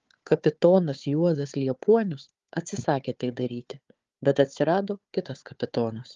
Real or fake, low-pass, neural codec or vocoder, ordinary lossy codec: fake; 7.2 kHz; codec, 16 kHz, 4 kbps, FunCodec, trained on Chinese and English, 50 frames a second; Opus, 32 kbps